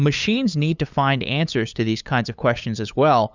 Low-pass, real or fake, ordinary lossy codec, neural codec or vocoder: 7.2 kHz; fake; Opus, 64 kbps; codec, 16 kHz, 8 kbps, FunCodec, trained on LibriTTS, 25 frames a second